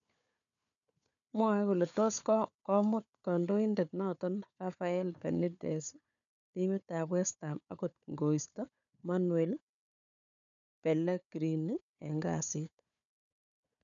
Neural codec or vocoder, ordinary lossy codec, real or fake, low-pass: codec, 16 kHz, 4 kbps, FunCodec, trained on Chinese and English, 50 frames a second; none; fake; 7.2 kHz